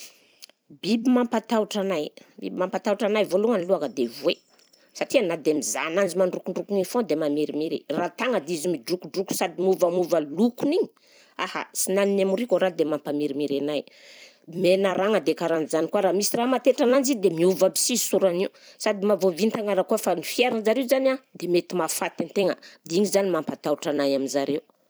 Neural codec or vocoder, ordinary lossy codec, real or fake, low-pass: vocoder, 44.1 kHz, 128 mel bands every 256 samples, BigVGAN v2; none; fake; none